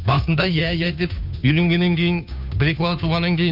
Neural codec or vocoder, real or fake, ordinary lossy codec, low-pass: codec, 16 kHz, 2 kbps, FunCodec, trained on Chinese and English, 25 frames a second; fake; none; 5.4 kHz